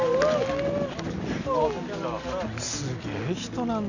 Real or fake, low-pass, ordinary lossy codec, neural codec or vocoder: real; 7.2 kHz; none; none